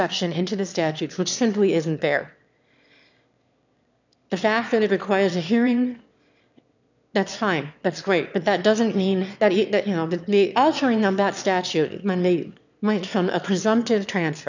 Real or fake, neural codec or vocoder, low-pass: fake; autoencoder, 22.05 kHz, a latent of 192 numbers a frame, VITS, trained on one speaker; 7.2 kHz